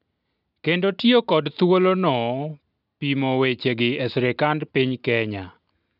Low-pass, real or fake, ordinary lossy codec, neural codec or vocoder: 5.4 kHz; real; none; none